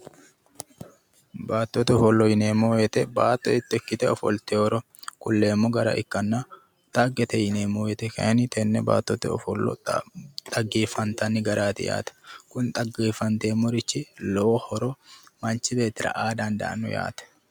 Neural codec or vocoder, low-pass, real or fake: none; 19.8 kHz; real